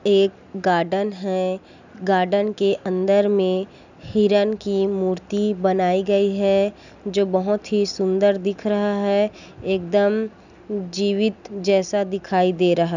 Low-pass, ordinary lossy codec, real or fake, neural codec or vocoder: 7.2 kHz; none; real; none